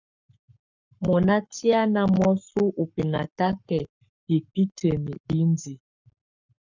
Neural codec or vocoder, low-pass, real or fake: codec, 44.1 kHz, 7.8 kbps, Pupu-Codec; 7.2 kHz; fake